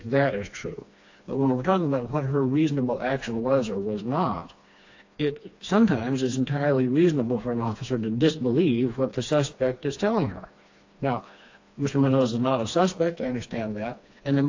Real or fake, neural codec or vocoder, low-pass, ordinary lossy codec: fake; codec, 16 kHz, 2 kbps, FreqCodec, smaller model; 7.2 kHz; AAC, 48 kbps